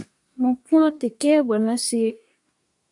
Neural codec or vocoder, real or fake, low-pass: codec, 24 kHz, 1 kbps, SNAC; fake; 10.8 kHz